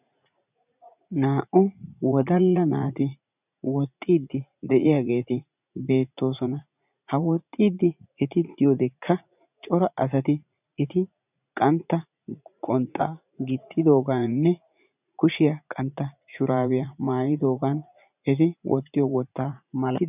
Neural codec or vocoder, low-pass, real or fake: vocoder, 44.1 kHz, 80 mel bands, Vocos; 3.6 kHz; fake